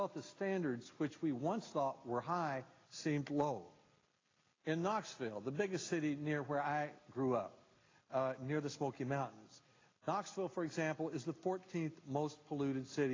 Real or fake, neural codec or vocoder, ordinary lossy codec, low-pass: real; none; AAC, 32 kbps; 7.2 kHz